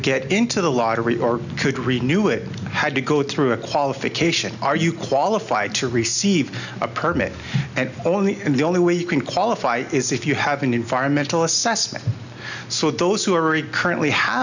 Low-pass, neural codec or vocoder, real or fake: 7.2 kHz; none; real